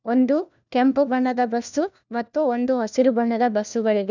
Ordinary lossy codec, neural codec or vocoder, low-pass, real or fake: none; codec, 16 kHz, 1 kbps, FunCodec, trained on LibriTTS, 50 frames a second; 7.2 kHz; fake